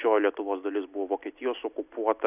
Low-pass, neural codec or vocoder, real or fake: 3.6 kHz; none; real